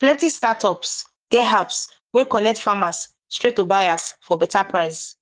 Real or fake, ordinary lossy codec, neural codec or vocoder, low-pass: fake; Opus, 24 kbps; codec, 44.1 kHz, 2.6 kbps, SNAC; 9.9 kHz